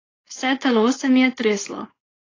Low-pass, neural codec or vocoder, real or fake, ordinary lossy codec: 7.2 kHz; codec, 16 kHz, 4.8 kbps, FACodec; fake; AAC, 32 kbps